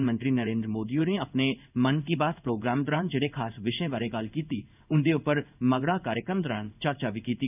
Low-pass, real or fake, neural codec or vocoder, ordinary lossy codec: 3.6 kHz; fake; codec, 16 kHz in and 24 kHz out, 1 kbps, XY-Tokenizer; none